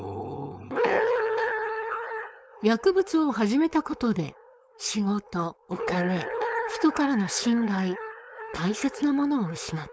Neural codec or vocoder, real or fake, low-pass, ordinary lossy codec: codec, 16 kHz, 4.8 kbps, FACodec; fake; none; none